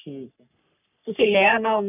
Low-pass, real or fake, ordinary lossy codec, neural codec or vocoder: 3.6 kHz; fake; none; codec, 44.1 kHz, 3.4 kbps, Pupu-Codec